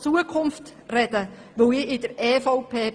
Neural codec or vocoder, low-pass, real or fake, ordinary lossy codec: none; 9.9 kHz; real; Opus, 24 kbps